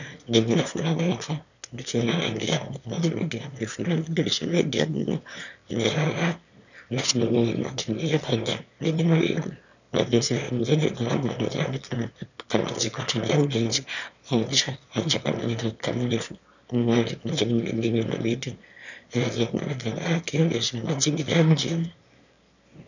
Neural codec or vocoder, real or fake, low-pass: autoencoder, 22.05 kHz, a latent of 192 numbers a frame, VITS, trained on one speaker; fake; 7.2 kHz